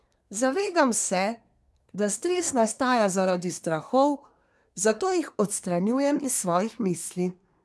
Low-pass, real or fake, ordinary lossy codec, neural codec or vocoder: none; fake; none; codec, 24 kHz, 1 kbps, SNAC